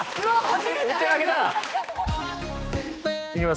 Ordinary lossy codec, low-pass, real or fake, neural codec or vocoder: none; none; fake; codec, 16 kHz, 2 kbps, X-Codec, HuBERT features, trained on balanced general audio